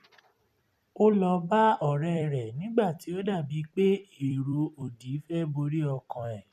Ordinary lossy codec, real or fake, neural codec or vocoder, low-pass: none; fake; vocoder, 44.1 kHz, 128 mel bands every 256 samples, BigVGAN v2; 14.4 kHz